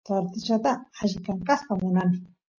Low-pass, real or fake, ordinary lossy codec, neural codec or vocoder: 7.2 kHz; real; MP3, 32 kbps; none